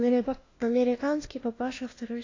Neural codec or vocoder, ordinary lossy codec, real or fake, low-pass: codec, 16 kHz, 1 kbps, FunCodec, trained on LibriTTS, 50 frames a second; AAC, 32 kbps; fake; 7.2 kHz